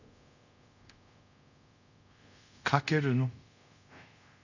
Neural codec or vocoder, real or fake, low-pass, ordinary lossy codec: codec, 24 kHz, 0.5 kbps, DualCodec; fake; 7.2 kHz; none